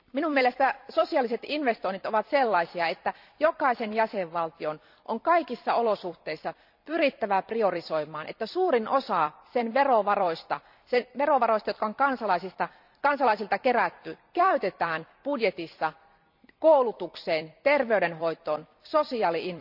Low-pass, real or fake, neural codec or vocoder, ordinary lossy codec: 5.4 kHz; fake; vocoder, 44.1 kHz, 128 mel bands every 256 samples, BigVGAN v2; none